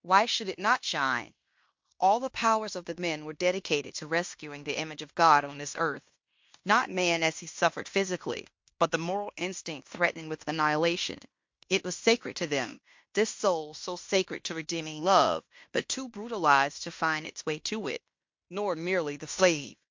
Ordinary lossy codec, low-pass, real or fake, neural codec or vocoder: MP3, 48 kbps; 7.2 kHz; fake; codec, 16 kHz in and 24 kHz out, 0.9 kbps, LongCat-Audio-Codec, fine tuned four codebook decoder